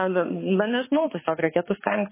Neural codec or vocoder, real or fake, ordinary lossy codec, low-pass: codec, 16 kHz, 4 kbps, X-Codec, HuBERT features, trained on balanced general audio; fake; MP3, 16 kbps; 3.6 kHz